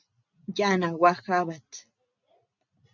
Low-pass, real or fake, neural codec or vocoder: 7.2 kHz; real; none